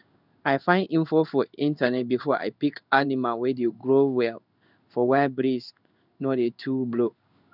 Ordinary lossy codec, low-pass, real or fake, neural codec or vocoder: none; 5.4 kHz; fake; codec, 16 kHz in and 24 kHz out, 1 kbps, XY-Tokenizer